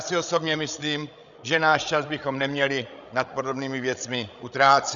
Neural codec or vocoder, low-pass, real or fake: codec, 16 kHz, 16 kbps, FreqCodec, larger model; 7.2 kHz; fake